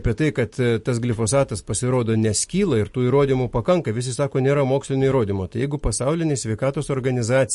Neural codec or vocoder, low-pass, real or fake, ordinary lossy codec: none; 14.4 kHz; real; MP3, 48 kbps